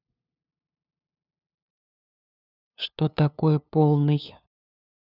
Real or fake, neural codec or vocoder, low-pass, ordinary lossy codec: fake; codec, 16 kHz, 2 kbps, FunCodec, trained on LibriTTS, 25 frames a second; 5.4 kHz; none